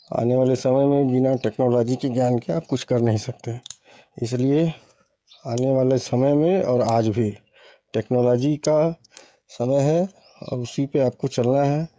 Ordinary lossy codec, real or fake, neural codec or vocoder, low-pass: none; fake; codec, 16 kHz, 16 kbps, FreqCodec, smaller model; none